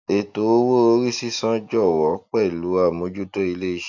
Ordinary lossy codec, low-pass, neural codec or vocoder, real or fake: none; 7.2 kHz; none; real